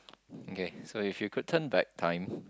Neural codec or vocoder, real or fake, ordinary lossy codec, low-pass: none; real; none; none